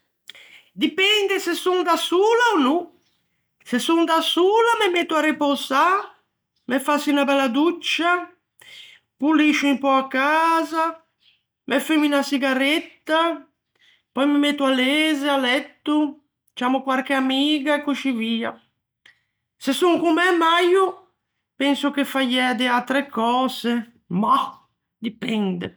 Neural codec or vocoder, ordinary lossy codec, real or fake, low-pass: none; none; real; none